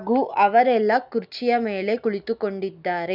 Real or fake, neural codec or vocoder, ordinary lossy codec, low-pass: real; none; none; 5.4 kHz